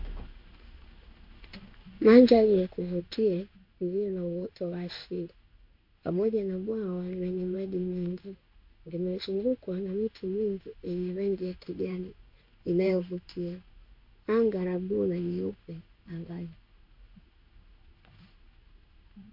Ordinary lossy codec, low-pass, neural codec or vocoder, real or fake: MP3, 48 kbps; 5.4 kHz; codec, 16 kHz in and 24 kHz out, 1 kbps, XY-Tokenizer; fake